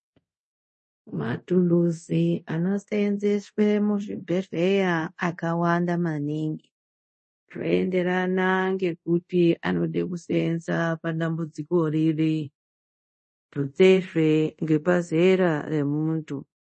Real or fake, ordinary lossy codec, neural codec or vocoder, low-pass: fake; MP3, 32 kbps; codec, 24 kHz, 0.5 kbps, DualCodec; 10.8 kHz